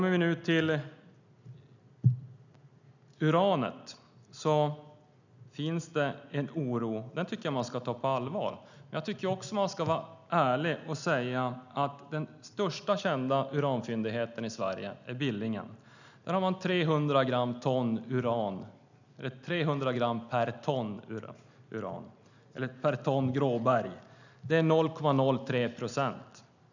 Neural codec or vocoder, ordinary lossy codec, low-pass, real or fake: none; none; 7.2 kHz; real